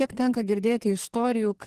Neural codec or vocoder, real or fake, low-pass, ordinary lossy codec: codec, 44.1 kHz, 2.6 kbps, SNAC; fake; 14.4 kHz; Opus, 16 kbps